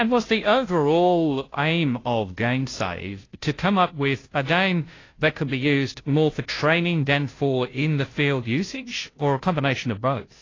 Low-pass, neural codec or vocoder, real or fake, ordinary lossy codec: 7.2 kHz; codec, 16 kHz, 0.5 kbps, FunCodec, trained on Chinese and English, 25 frames a second; fake; AAC, 32 kbps